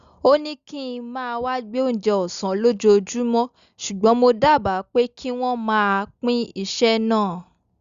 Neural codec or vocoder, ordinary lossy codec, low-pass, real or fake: none; Opus, 64 kbps; 7.2 kHz; real